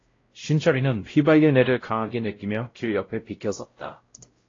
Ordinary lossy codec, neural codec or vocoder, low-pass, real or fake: AAC, 32 kbps; codec, 16 kHz, 0.5 kbps, X-Codec, WavLM features, trained on Multilingual LibriSpeech; 7.2 kHz; fake